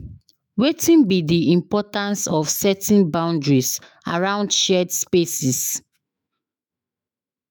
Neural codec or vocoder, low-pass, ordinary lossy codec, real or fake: autoencoder, 48 kHz, 128 numbers a frame, DAC-VAE, trained on Japanese speech; none; none; fake